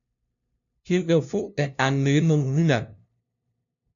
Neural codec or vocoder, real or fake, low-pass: codec, 16 kHz, 0.5 kbps, FunCodec, trained on LibriTTS, 25 frames a second; fake; 7.2 kHz